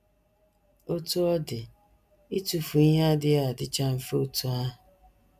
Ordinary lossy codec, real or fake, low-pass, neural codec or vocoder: none; real; 14.4 kHz; none